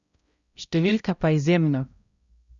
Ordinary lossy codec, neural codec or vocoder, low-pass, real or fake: Opus, 64 kbps; codec, 16 kHz, 0.5 kbps, X-Codec, HuBERT features, trained on balanced general audio; 7.2 kHz; fake